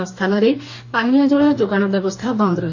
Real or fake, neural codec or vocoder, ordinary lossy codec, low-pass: fake; codec, 44.1 kHz, 2.6 kbps, DAC; AAC, 48 kbps; 7.2 kHz